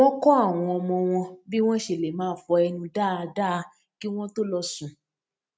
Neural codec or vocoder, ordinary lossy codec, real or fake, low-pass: none; none; real; none